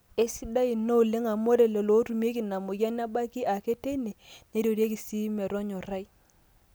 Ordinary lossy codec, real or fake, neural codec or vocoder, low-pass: none; real; none; none